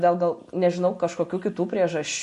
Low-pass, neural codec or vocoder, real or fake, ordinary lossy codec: 14.4 kHz; none; real; MP3, 48 kbps